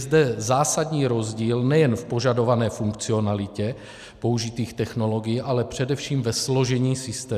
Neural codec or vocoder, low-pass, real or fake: none; 14.4 kHz; real